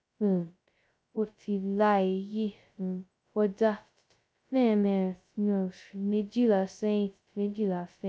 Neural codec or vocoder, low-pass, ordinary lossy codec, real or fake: codec, 16 kHz, 0.2 kbps, FocalCodec; none; none; fake